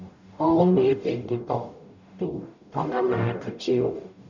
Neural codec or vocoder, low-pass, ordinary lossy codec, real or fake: codec, 44.1 kHz, 0.9 kbps, DAC; 7.2 kHz; none; fake